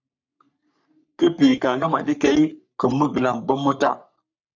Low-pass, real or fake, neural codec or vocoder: 7.2 kHz; fake; codec, 44.1 kHz, 3.4 kbps, Pupu-Codec